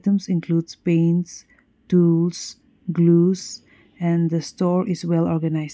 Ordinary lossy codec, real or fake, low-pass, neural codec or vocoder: none; real; none; none